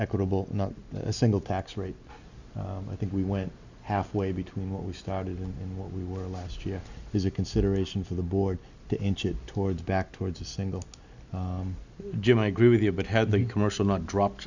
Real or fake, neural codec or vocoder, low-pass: real; none; 7.2 kHz